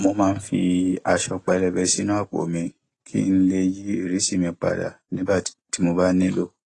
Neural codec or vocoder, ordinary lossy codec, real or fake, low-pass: none; AAC, 32 kbps; real; 10.8 kHz